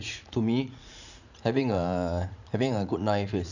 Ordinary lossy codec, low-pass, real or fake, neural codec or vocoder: none; 7.2 kHz; fake; vocoder, 44.1 kHz, 80 mel bands, Vocos